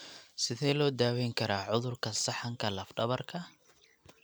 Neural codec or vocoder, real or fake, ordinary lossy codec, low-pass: none; real; none; none